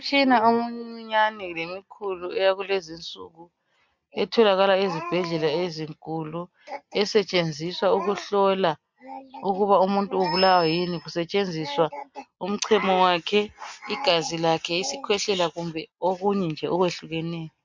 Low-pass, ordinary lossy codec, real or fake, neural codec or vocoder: 7.2 kHz; MP3, 64 kbps; real; none